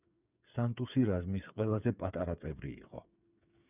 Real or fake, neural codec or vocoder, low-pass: fake; codec, 16 kHz, 8 kbps, FreqCodec, smaller model; 3.6 kHz